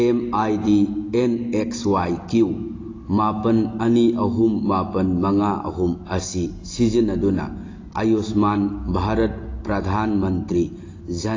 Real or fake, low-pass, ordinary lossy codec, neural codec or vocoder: real; 7.2 kHz; AAC, 32 kbps; none